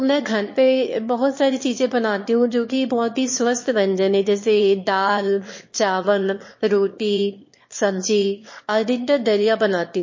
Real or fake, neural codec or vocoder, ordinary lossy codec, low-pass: fake; autoencoder, 22.05 kHz, a latent of 192 numbers a frame, VITS, trained on one speaker; MP3, 32 kbps; 7.2 kHz